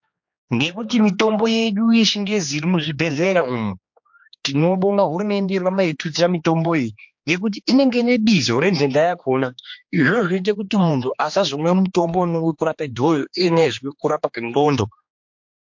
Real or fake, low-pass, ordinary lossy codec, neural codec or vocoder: fake; 7.2 kHz; MP3, 48 kbps; codec, 16 kHz, 2 kbps, X-Codec, HuBERT features, trained on general audio